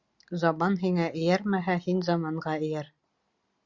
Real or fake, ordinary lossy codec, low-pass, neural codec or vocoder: real; Opus, 64 kbps; 7.2 kHz; none